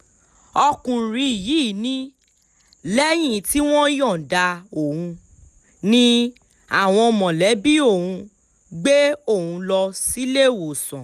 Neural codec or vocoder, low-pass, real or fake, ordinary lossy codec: none; 14.4 kHz; real; none